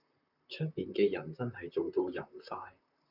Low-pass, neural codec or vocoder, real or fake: 5.4 kHz; vocoder, 44.1 kHz, 128 mel bands, Pupu-Vocoder; fake